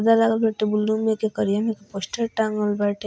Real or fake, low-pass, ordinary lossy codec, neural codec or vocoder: real; none; none; none